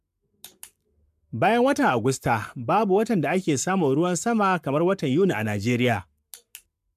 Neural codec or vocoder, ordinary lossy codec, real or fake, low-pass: codec, 44.1 kHz, 7.8 kbps, Pupu-Codec; MP3, 96 kbps; fake; 14.4 kHz